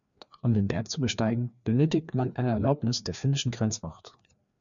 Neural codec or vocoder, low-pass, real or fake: codec, 16 kHz, 2 kbps, FreqCodec, larger model; 7.2 kHz; fake